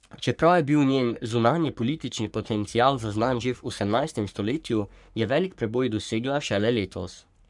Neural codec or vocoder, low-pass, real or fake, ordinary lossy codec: codec, 44.1 kHz, 3.4 kbps, Pupu-Codec; 10.8 kHz; fake; none